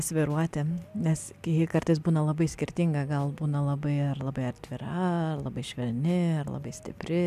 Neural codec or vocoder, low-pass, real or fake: none; 14.4 kHz; real